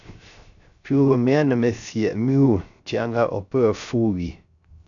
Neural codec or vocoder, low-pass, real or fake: codec, 16 kHz, 0.3 kbps, FocalCodec; 7.2 kHz; fake